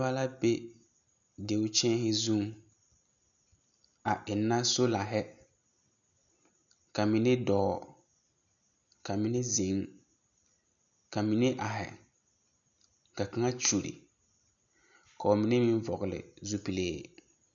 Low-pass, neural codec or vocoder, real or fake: 7.2 kHz; none; real